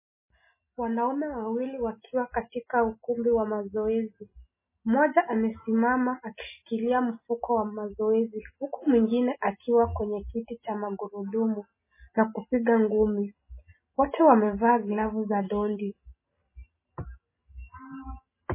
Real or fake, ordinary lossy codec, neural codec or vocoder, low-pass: real; MP3, 16 kbps; none; 3.6 kHz